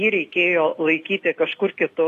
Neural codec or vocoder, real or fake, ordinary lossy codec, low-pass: none; real; AAC, 48 kbps; 14.4 kHz